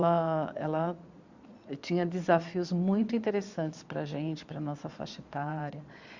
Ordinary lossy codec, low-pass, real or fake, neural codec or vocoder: Opus, 64 kbps; 7.2 kHz; fake; vocoder, 22.05 kHz, 80 mel bands, Vocos